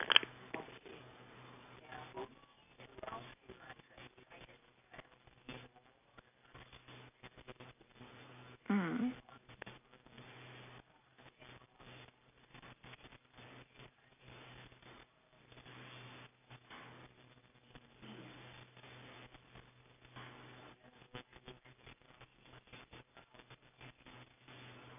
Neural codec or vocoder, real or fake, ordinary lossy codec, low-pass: none; real; none; 3.6 kHz